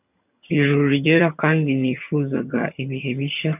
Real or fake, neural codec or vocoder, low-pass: fake; vocoder, 22.05 kHz, 80 mel bands, HiFi-GAN; 3.6 kHz